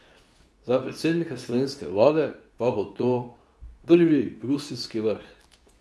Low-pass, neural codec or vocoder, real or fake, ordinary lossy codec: none; codec, 24 kHz, 0.9 kbps, WavTokenizer, medium speech release version 2; fake; none